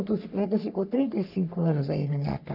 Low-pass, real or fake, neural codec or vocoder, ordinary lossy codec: 5.4 kHz; fake; codec, 44.1 kHz, 3.4 kbps, Pupu-Codec; none